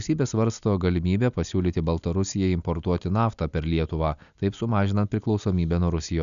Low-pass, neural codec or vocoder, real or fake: 7.2 kHz; none; real